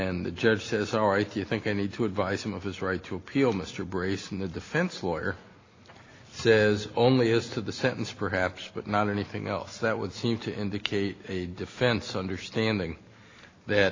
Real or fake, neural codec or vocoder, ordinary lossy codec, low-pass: real; none; AAC, 32 kbps; 7.2 kHz